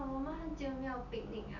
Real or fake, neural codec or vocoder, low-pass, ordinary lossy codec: real; none; 7.2 kHz; none